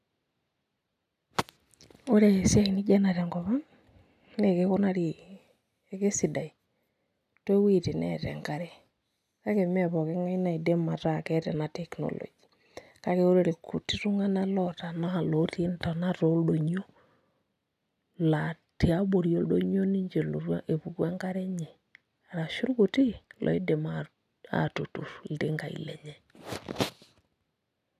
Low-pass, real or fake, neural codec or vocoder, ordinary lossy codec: 14.4 kHz; real; none; none